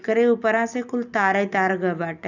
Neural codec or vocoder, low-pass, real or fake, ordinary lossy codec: none; 7.2 kHz; real; none